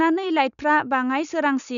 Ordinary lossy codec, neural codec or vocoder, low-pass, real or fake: none; none; 7.2 kHz; real